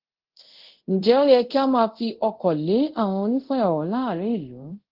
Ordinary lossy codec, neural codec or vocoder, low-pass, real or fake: Opus, 16 kbps; codec, 24 kHz, 0.5 kbps, DualCodec; 5.4 kHz; fake